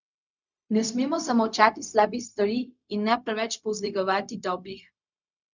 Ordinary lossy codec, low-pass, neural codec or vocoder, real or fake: none; 7.2 kHz; codec, 16 kHz, 0.4 kbps, LongCat-Audio-Codec; fake